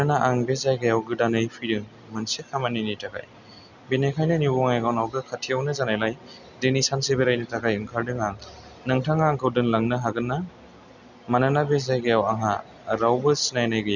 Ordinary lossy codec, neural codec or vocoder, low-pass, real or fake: none; none; 7.2 kHz; real